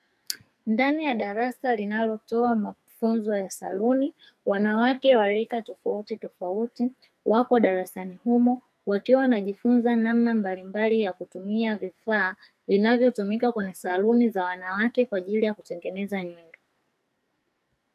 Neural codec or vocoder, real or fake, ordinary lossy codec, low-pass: codec, 44.1 kHz, 2.6 kbps, SNAC; fake; AAC, 96 kbps; 14.4 kHz